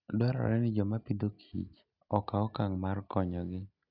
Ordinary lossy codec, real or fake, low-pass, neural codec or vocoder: none; real; 5.4 kHz; none